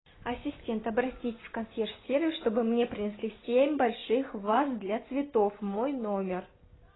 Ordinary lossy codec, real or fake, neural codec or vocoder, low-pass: AAC, 16 kbps; real; none; 7.2 kHz